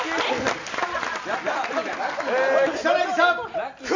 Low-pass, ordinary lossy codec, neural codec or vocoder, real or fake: 7.2 kHz; AAC, 48 kbps; none; real